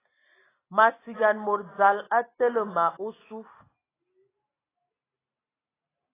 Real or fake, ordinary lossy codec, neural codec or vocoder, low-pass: real; AAC, 16 kbps; none; 3.6 kHz